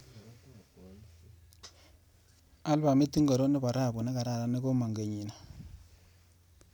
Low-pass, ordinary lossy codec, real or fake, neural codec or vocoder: none; none; real; none